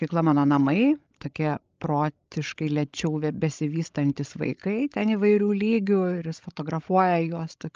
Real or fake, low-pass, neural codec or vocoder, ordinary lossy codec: fake; 7.2 kHz; codec, 16 kHz, 16 kbps, FreqCodec, larger model; Opus, 24 kbps